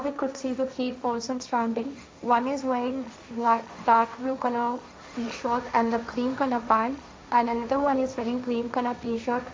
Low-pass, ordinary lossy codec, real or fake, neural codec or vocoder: none; none; fake; codec, 16 kHz, 1.1 kbps, Voila-Tokenizer